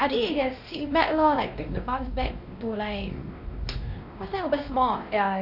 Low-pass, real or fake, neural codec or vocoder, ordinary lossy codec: 5.4 kHz; fake; codec, 16 kHz, 1 kbps, X-Codec, WavLM features, trained on Multilingual LibriSpeech; none